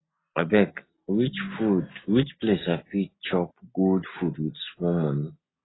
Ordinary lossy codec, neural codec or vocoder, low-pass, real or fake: AAC, 16 kbps; none; 7.2 kHz; real